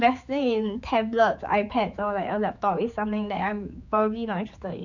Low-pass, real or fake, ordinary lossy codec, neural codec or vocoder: 7.2 kHz; fake; none; codec, 16 kHz, 4 kbps, X-Codec, HuBERT features, trained on general audio